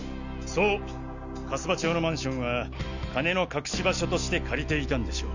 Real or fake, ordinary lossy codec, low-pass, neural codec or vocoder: real; none; 7.2 kHz; none